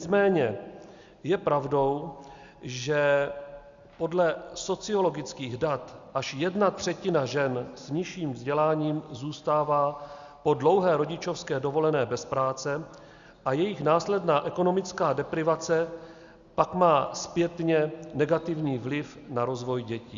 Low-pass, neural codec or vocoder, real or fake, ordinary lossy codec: 7.2 kHz; none; real; Opus, 64 kbps